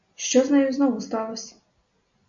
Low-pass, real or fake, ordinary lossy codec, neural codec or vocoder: 7.2 kHz; real; AAC, 64 kbps; none